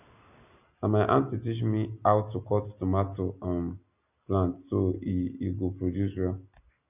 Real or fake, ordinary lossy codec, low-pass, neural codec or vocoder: real; none; 3.6 kHz; none